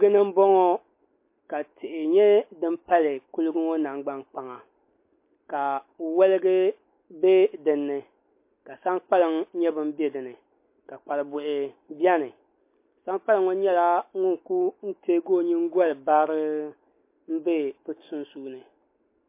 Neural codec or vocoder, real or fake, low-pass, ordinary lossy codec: none; real; 3.6 kHz; MP3, 24 kbps